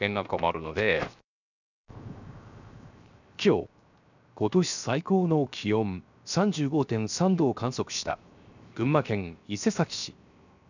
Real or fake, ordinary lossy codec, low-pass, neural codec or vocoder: fake; none; 7.2 kHz; codec, 16 kHz, 0.7 kbps, FocalCodec